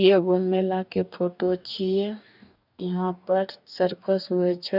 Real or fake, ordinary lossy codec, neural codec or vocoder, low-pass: fake; none; codec, 44.1 kHz, 2.6 kbps, DAC; 5.4 kHz